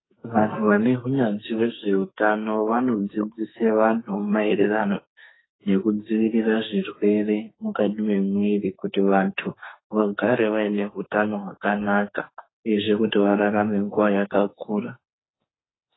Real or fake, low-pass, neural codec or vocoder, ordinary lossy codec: fake; 7.2 kHz; codec, 44.1 kHz, 2.6 kbps, SNAC; AAC, 16 kbps